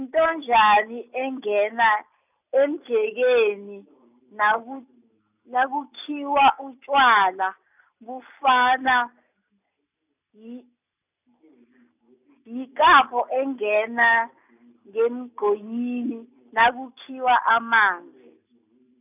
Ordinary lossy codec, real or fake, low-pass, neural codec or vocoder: none; real; 3.6 kHz; none